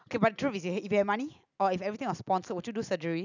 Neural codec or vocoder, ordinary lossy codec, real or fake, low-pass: none; none; real; 7.2 kHz